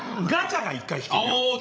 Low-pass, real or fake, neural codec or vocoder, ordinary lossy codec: none; fake; codec, 16 kHz, 16 kbps, FreqCodec, larger model; none